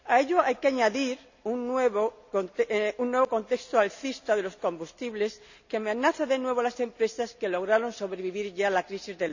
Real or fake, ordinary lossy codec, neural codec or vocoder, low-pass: real; none; none; 7.2 kHz